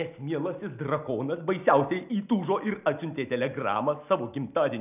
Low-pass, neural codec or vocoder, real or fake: 3.6 kHz; none; real